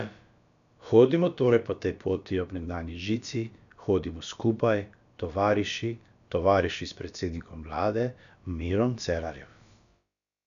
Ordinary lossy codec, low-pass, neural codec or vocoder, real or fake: none; 7.2 kHz; codec, 16 kHz, about 1 kbps, DyCAST, with the encoder's durations; fake